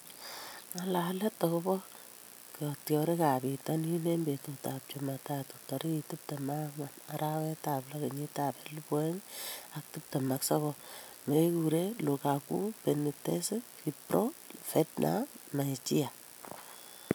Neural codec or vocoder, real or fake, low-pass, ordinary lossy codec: none; real; none; none